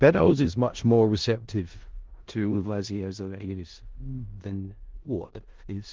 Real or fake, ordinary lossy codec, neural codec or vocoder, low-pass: fake; Opus, 16 kbps; codec, 16 kHz in and 24 kHz out, 0.4 kbps, LongCat-Audio-Codec, four codebook decoder; 7.2 kHz